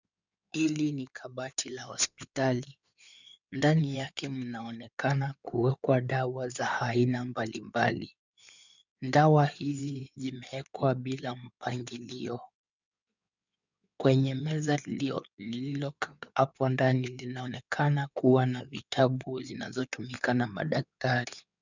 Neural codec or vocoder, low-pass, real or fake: codec, 16 kHz in and 24 kHz out, 2.2 kbps, FireRedTTS-2 codec; 7.2 kHz; fake